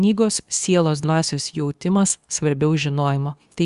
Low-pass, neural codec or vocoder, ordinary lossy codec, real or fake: 10.8 kHz; codec, 24 kHz, 0.9 kbps, WavTokenizer, medium speech release version 2; Opus, 64 kbps; fake